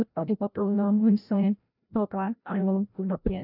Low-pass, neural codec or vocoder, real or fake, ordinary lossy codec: 5.4 kHz; codec, 16 kHz, 0.5 kbps, FreqCodec, larger model; fake; none